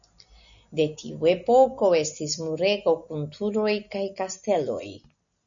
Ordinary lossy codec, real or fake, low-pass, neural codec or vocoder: MP3, 96 kbps; real; 7.2 kHz; none